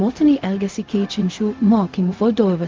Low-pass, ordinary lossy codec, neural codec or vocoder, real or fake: 7.2 kHz; Opus, 24 kbps; codec, 16 kHz, 0.4 kbps, LongCat-Audio-Codec; fake